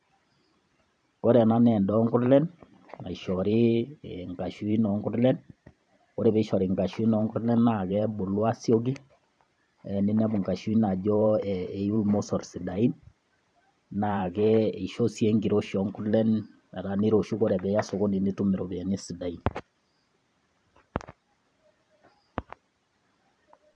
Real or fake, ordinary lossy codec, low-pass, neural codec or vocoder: fake; none; 9.9 kHz; vocoder, 44.1 kHz, 128 mel bands every 512 samples, BigVGAN v2